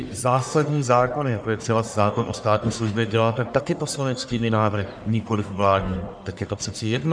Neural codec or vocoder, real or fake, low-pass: codec, 44.1 kHz, 1.7 kbps, Pupu-Codec; fake; 9.9 kHz